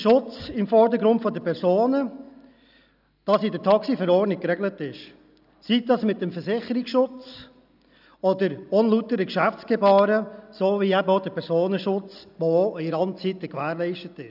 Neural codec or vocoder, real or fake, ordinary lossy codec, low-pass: none; real; none; 5.4 kHz